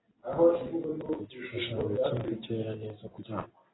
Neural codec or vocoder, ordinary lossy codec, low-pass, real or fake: none; AAC, 16 kbps; 7.2 kHz; real